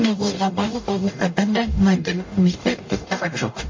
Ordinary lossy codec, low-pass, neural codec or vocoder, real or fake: MP3, 32 kbps; 7.2 kHz; codec, 44.1 kHz, 0.9 kbps, DAC; fake